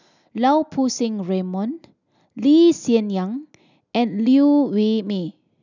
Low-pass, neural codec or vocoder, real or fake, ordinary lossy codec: 7.2 kHz; none; real; none